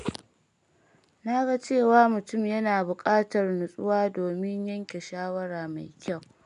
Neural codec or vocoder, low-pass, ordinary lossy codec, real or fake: none; 10.8 kHz; none; real